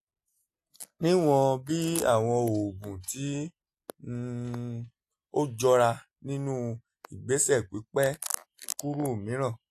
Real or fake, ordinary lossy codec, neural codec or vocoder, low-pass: real; AAC, 64 kbps; none; 14.4 kHz